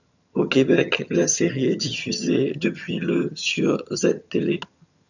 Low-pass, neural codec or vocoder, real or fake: 7.2 kHz; vocoder, 22.05 kHz, 80 mel bands, HiFi-GAN; fake